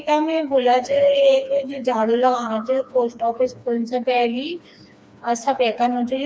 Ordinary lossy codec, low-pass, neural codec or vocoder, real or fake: none; none; codec, 16 kHz, 2 kbps, FreqCodec, smaller model; fake